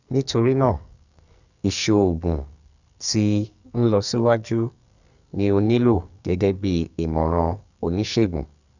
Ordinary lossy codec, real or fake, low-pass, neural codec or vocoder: none; fake; 7.2 kHz; codec, 44.1 kHz, 2.6 kbps, SNAC